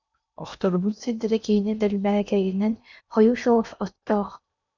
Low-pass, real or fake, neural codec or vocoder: 7.2 kHz; fake; codec, 16 kHz in and 24 kHz out, 0.8 kbps, FocalCodec, streaming, 65536 codes